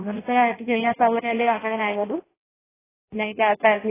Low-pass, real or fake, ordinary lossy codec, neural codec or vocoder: 3.6 kHz; fake; AAC, 16 kbps; codec, 16 kHz in and 24 kHz out, 0.6 kbps, FireRedTTS-2 codec